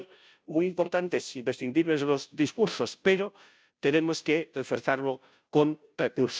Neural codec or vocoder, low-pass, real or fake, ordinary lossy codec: codec, 16 kHz, 0.5 kbps, FunCodec, trained on Chinese and English, 25 frames a second; none; fake; none